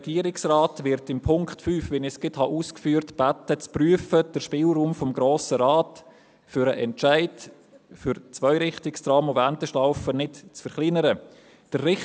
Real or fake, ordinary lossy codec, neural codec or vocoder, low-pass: real; none; none; none